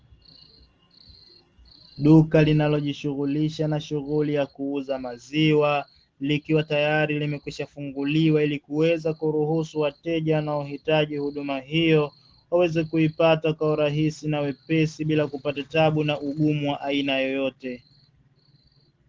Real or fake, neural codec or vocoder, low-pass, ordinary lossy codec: real; none; 7.2 kHz; Opus, 16 kbps